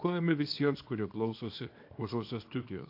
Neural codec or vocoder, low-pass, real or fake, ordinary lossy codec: codec, 24 kHz, 0.9 kbps, WavTokenizer, small release; 5.4 kHz; fake; AAC, 32 kbps